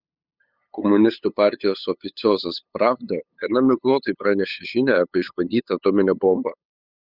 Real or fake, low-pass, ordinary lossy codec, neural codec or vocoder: fake; 5.4 kHz; Opus, 64 kbps; codec, 16 kHz, 8 kbps, FunCodec, trained on LibriTTS, 25 frames a second